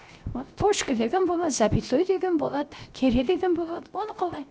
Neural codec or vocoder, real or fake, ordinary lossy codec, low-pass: codec, 16 kHz, 0.7 kbps, FocalCodec; fake; none; none